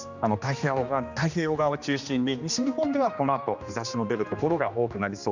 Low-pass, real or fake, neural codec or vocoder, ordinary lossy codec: 7.2 kHz; fake; codec, 16 kHz, 2 kbps, X-Codec, HuBERT features, trained on general audio; none